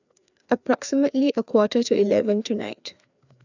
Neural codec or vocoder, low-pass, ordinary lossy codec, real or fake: codec, 16 kHz, 2 kbps, FreqCodec, larger model; 7.2 kHz; none; fake